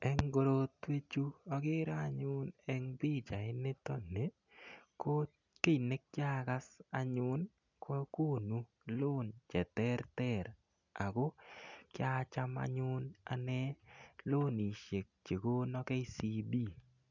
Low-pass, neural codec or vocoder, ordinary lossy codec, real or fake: 7.2 kHz; none; none; real